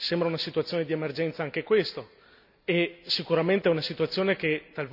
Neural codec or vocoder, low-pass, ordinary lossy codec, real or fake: none; 5.4 kHz; none; real